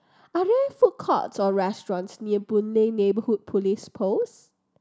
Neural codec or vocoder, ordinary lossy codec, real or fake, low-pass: none; none; real; none